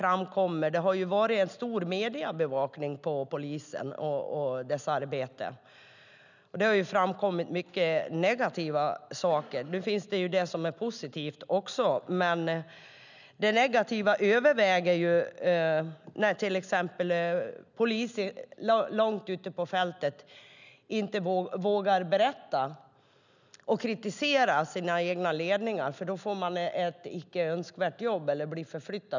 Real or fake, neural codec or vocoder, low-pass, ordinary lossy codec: real; none; 7.2 kHz; none